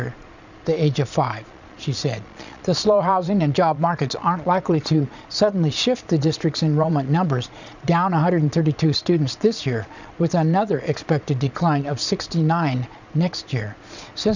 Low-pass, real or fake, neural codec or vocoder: 7.2 kHz; fake; vocoder, 22.05 kHz, 80 mel bands, Vocos